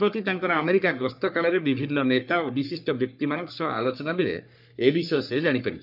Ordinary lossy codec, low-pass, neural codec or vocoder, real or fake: none; 5.4 kHz; codec, 44.1 kHz, 3.4 kbps, Pupu-Codec; fake